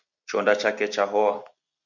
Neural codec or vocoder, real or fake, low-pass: none; real; 7.2 kHz